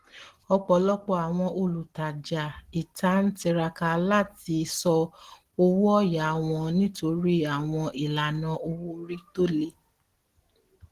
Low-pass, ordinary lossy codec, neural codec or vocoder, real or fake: 14.4 kHz; Opus, 16 kbps; none; real